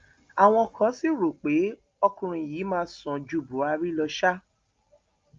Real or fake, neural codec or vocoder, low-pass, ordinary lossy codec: real; none; 7.2 kHz; Opus, 32 kbps